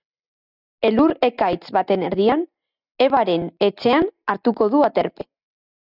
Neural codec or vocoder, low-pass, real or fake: none; 5.4 kHz; real